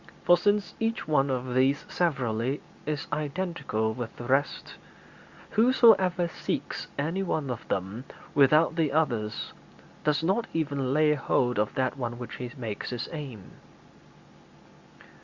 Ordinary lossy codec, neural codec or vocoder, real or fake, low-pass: Opus, 64 kbps; none; real; 7.2 kHz